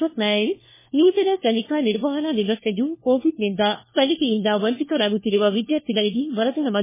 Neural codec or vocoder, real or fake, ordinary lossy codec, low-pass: codec, 16 kHz, 1 kbps, FunCodec, trained on LibriTTS, 50 frames a second; fake; MP3, 16 kbps; 3.6 kHz